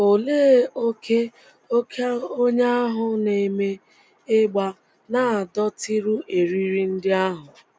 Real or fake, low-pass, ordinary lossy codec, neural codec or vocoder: real; none; none; none